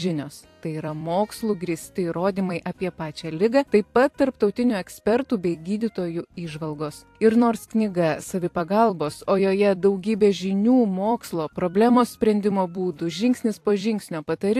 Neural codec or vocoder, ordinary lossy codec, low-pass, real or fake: vocoder, 44.1 kHz, 128 mel bands every 256 samples, BigVGAN v2; AAC, 64 kbps; 14.4 kHz; fake